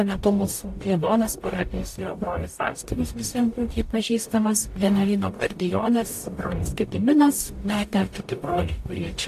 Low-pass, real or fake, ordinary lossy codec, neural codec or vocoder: 14.4 kHz; fake; AAC, 64 kbps; codec, 44.1 kHz, 0.9 kbps, DAC